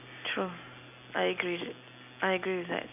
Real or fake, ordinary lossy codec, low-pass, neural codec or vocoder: real; none; 3.6 kHz; none